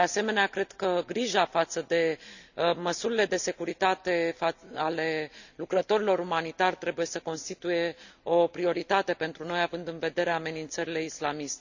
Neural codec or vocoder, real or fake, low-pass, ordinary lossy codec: none; real; 7.2 kHz; none